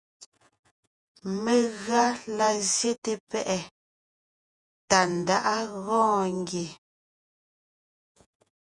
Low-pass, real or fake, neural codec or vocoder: 10.8 kHz; fake; vocoder, 48 kHz, 128 mel bands, Vocos